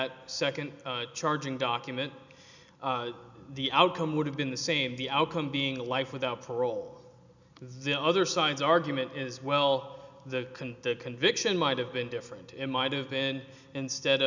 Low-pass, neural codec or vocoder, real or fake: 7.2 kHz; none; real